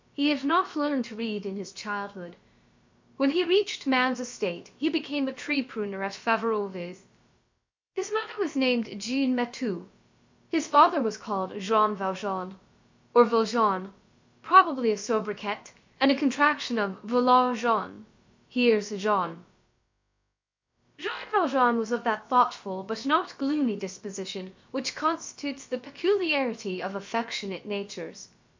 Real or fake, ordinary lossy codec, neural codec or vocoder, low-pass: fake; MP3, 48 kbps; codec, 16 kHz, about 1 kbps, DyCAST, with the encoder's durations; 7.2 kHz